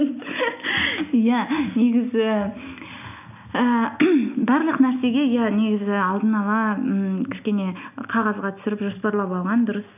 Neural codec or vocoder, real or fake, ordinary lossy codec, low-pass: none; real; none; 3.6 kHz